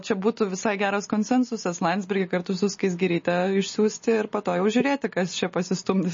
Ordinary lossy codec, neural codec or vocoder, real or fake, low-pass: MP3, 32 kbps; none; real; 7.2 kHz